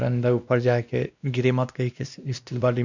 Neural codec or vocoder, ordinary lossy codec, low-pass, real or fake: codec, 16 kHz, 1 kbps, X-Codec, WavLM features, trained on Multilingual LibriSpeech; none; 7.2 kHz; fake